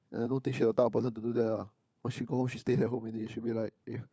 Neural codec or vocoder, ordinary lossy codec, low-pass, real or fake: codec, 16 kHz, 4 kbps, FunCodec, trained on LibriTTS, 50 frames a second; none; none; fake